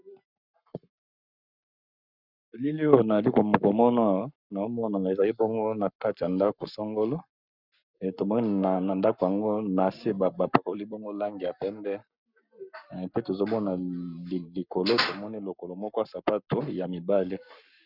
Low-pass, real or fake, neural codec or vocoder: 5.4 kHz; fake; codec, 44.1 kHz, 7.8 kbps, Pupu-Codec